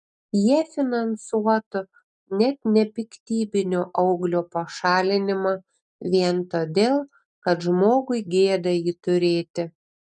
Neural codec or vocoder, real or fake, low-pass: none; real; 9.9 kHz